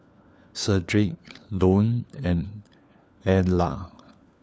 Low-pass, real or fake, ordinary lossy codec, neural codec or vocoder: none; fake; none; codec, 16 kHz, 4 kbps, FunCodec, trained on LibriTTS, 50 frames a second